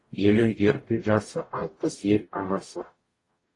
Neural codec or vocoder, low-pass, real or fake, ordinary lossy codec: codec, 44.1 kHz, 0.9 kbps, DAC; 10.8 kHz; fake; AAC, 32 kbps